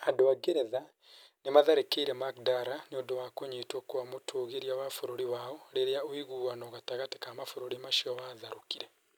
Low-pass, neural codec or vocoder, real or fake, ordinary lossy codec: none; none; real; none